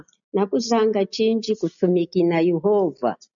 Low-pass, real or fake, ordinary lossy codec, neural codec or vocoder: 7.2 kHz; real; MP3, 96 kbps; none